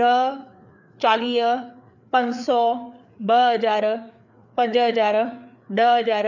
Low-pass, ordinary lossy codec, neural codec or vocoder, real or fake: 7.2 kHz; none; codec, 16 kHz, 4 kbps, FreqCodec, larger model; fake